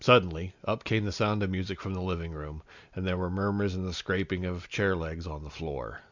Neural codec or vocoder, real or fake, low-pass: none; real; 7.2 kHz